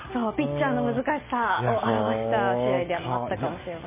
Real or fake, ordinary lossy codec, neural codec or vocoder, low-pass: real; MP3, 16 kbps; none; 3.6 kHz